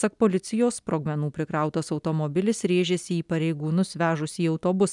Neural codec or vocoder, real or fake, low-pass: none; real; 10.8 kHz